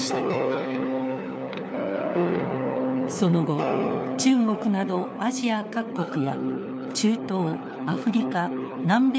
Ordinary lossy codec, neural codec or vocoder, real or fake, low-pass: none; codec, 16 kHz, 4 kbps, FunCodec, trained on LibriTTS, 50 frames a second; fake; none